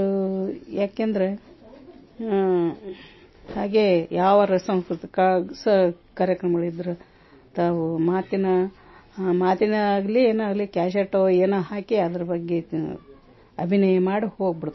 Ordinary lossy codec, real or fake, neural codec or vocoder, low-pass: MP3, 24 kbps; real; none; 7.2 kHz